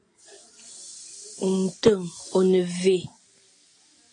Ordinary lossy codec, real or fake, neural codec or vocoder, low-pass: AAC, 32 kbps; real; none; 9.9 kHz